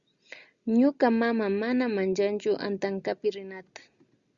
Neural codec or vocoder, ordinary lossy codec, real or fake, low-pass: none; Opus, 64 kbps; real; 7.2 kHz